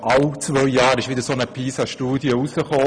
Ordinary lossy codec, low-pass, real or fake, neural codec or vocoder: none; 9.9 kHz; real; none